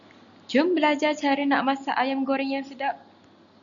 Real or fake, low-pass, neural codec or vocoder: real; 7.2 kHz; none